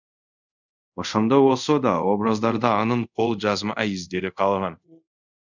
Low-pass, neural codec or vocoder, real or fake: 7.2 kHz; codec, 24 kHz, 0.5 kbps, DualCodec; fake